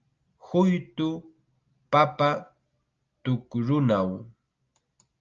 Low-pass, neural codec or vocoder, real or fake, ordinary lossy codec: 7.2 kHz; none; real; Opus, 24 kbps